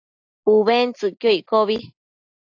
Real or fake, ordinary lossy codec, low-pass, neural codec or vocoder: real; AAC, 48 kbps; 7.2 kHz; none